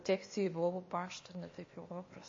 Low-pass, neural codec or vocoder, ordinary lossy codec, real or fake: 7.2 kHz; codec, 16 kHz, 0.8 kbps, ZipCodec; MP3, 32 kbps; fake